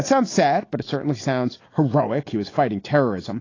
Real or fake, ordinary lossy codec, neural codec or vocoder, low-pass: real; AAC, 32 kbps; none; 7.2 kHz